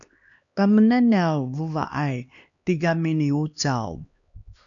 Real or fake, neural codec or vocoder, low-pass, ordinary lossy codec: fake; codec, 16 kHz, 2 kbps, X-Codec, HuBERT features, trained on LibriSpeech; 7.2 kHz; MP3, 64 kbps